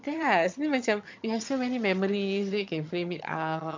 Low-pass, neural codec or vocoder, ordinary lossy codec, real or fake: 7.2 kHz; vocoder, 22.05 kHz, 80 mel bands, HiFi-GAN; MP3, 48 kbps; fake